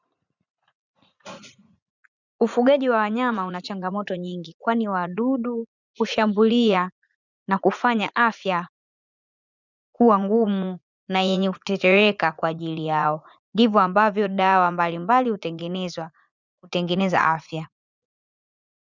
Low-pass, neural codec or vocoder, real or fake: 7.2 kHz; vocoder, 44.1 kHz, 80 mel bands, Vocos; fake